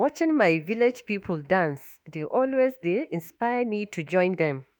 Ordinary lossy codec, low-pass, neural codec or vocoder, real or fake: none; none; autoencoder, 48 kHz, 32 numbers a frame, DAC-VAE, trained on Japanese speech; fake